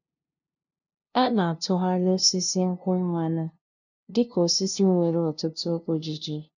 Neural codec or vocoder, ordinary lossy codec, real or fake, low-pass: codec, 16 kHz, 0.5 kbps, FunCodec, trained on LibriTTS, 25 frames a second; none; fake; 7.2 kHz